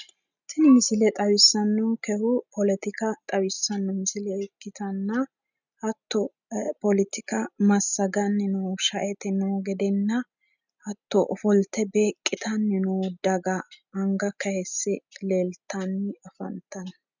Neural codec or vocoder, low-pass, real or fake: none; 7.2 kHz; real